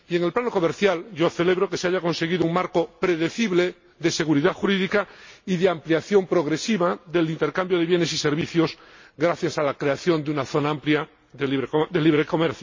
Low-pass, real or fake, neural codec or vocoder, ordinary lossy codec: 7.2 kHz; real; none; MP3, 32 kbps